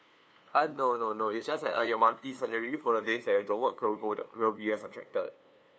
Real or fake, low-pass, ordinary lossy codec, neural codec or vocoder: fake; none; none; codec, 16 kHz, 2 kbps, FunCodec, trained on LibriTTS, 25 frames a second